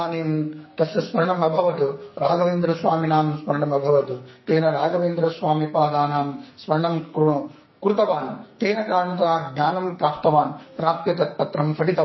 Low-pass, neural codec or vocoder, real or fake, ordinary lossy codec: 7.2 kHz; codec, 44.1 kHz, 2.6 kbps, SNAC; fake; MP3, 24 kbps